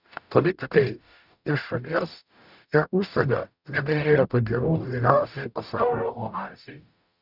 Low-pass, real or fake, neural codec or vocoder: 5.4 kHz; fake; codec, 44.1 kHz, 0.9 kbps, DAC